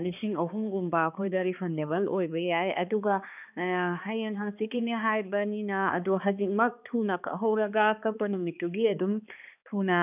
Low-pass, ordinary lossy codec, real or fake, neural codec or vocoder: 3.6 kHz; none; fake; codec, 16 kHz, 2 kbps, X-Codec, HuBERT features, trained on balanced general audio